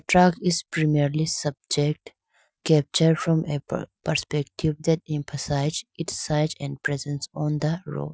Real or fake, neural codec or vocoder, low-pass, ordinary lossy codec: real; none; none; none